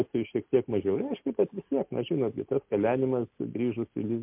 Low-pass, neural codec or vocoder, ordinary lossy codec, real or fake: 3.6 kHz; none; MP3, 32 kbps; real